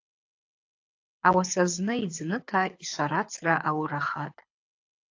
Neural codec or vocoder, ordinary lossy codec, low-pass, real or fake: codec, 24 kHz, 6 kbps, HILCodec; AAC, 48 kbps; 7.2 kHz; fake